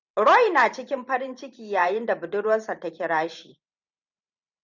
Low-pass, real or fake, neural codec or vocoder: 7.2 kHz; fake; vocoder, 44.1 kHz, 128 mel bands every 512 samples, BigVGAN v2